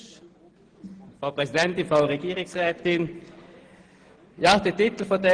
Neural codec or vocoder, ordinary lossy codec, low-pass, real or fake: none; Opus, 16 kbps; 9.9 kHz; real